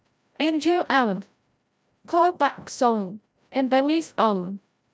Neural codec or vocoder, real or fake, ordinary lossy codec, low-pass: codec, 16 kHz, 0.5 kbps, FreqCodec, larger model; fake; none; none